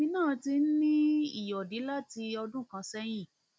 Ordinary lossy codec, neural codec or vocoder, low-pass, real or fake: none; none; none; real